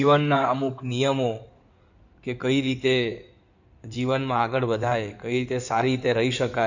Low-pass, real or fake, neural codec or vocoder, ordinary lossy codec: 7.2 kHz; fake; codec, 16 kHz in and 24 kHz out, 2.2 kbps, FireRedTTS-2 codec; none